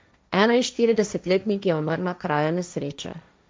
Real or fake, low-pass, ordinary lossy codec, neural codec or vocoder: fake; none; none; codec, 16 kHz, 1.1 kbps, Voila-Tokenizer